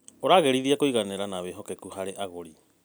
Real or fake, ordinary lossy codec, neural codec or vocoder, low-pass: real; none; none; none